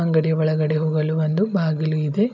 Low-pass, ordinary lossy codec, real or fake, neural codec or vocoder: 7.2 kHz; none; real; none